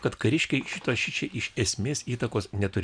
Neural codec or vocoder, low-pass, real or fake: none; 9.9 kHz; real